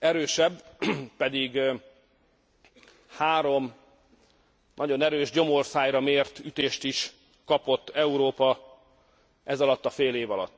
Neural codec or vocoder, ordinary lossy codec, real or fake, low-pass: none; none; real; none